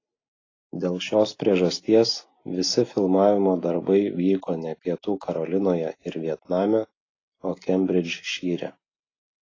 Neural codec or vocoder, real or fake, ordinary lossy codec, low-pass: none; real; AAC, 32 kbps; 7.2 kHz